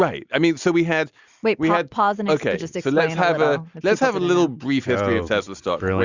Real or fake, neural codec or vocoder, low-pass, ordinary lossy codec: real; none; 7.2 kHz; Opus, 64 kbps